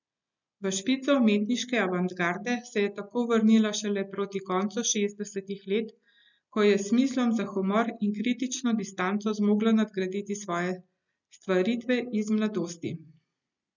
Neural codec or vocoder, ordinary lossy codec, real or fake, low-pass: none; none; real; 7.2 kHz